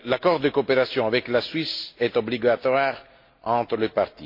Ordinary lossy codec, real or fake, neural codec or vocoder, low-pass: MP3, 32 kbps; real; none; 5.4 kHz